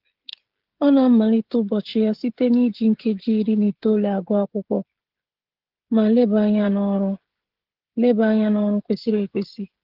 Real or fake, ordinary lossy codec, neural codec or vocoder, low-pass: fake; Opus, 16 kbps; codec, 16 kHz, 16 kbps, FreqCodec, smaller model; 5.4 kHz